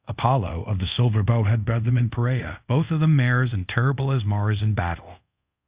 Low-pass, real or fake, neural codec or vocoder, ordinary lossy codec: 3.6 kHz; fake; codec, 24 kHz, 0.5 kbps, DualCodec; Opus, 64 kbps